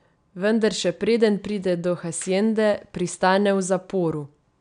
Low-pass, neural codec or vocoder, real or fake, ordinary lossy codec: 9.9 kHz; none; real; none